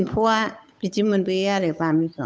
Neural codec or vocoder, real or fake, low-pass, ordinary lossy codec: codec, 16 kHz, 8 kbps, FunCodec, trained on Chinese and English, 25 frames a second; fake; none; none